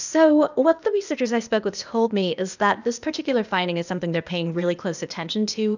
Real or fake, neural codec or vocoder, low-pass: fake; codec, 16 kHz, 0.8 kbps, ZipCodec; 7.2 kHz